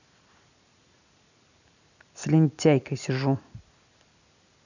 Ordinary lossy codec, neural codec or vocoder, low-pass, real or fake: none; none; 7.2 kHz; real